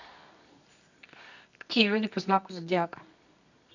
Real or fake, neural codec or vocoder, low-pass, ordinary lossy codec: fake; codec, 24 kHz, 0.9 kbps, WavTokenizer, medium music audio release; 7.2 kHz; none